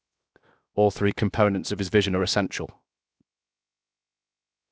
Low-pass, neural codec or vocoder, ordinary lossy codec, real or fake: none; codec, 16 kHz, 0.7 kbps, FocalCodec; none; fake